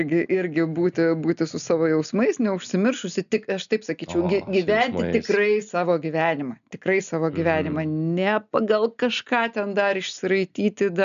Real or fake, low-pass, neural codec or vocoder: real; 7.2 kHz; none